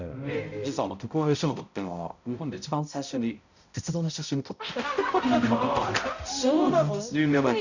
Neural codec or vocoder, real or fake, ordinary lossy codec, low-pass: codec, 16 kHz, 0.5 kbps, X-Codec, HuBERT features, trained on balanced general audio; fake; none; 7.2 kHz